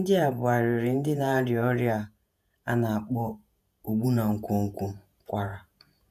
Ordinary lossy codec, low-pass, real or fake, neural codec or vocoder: none; 14.4 kHz; fake; vocoder, 48 kHz, 128 mel bands, Vocos